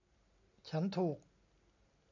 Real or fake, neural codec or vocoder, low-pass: real; none; 7.2 kHz